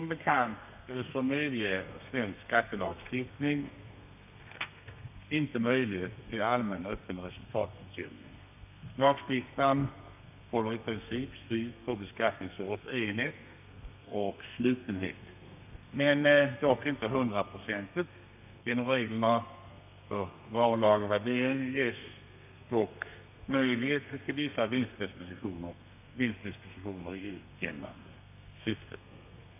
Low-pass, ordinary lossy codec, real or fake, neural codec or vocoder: 3.6 kHz; none; fake; codec, 32 kHz, 1.9 kbps, SNAC